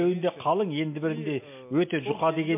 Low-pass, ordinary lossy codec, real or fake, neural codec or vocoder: 3.6 kHz; MP3, 24 kbps; real; none